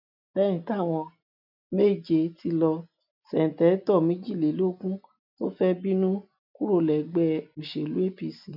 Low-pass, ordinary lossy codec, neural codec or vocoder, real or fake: 5.4 kHz; none; none; real